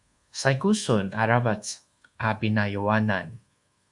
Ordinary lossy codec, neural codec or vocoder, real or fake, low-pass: Opus, 64 kbps; codec, 24 kHz, 1.2 kbps, DualCodec; fake; 10.8 kHz